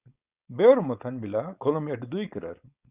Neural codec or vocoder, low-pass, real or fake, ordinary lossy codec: codec, 16 kHz, 4.8 kbps, FACodec; 3.6 kHz; fake; Opus, 64 kbps